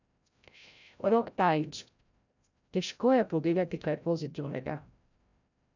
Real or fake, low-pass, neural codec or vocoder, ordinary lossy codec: fake; 7.2 kHz; codec, 16 kHz, 0.5 kbps, FreqCodec, larger model; none